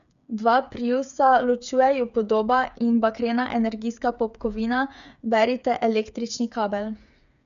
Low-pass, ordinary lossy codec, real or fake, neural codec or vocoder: 7.2 kHz; none; fake; codec, 16 kHz, 8 kbps, FreqCodec, smaller model